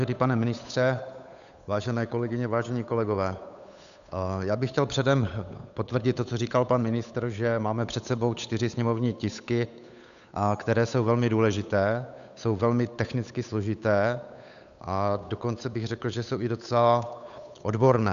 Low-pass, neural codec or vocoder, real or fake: 7.2 kHz; codec, 16 kHz, 8 kbps, FunCodec, trained on Chinese and English, 25 frames a second; fake